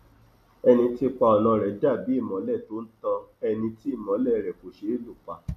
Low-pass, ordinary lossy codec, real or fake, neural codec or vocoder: 14.4 kHz; MP3, 64 kbps; real; none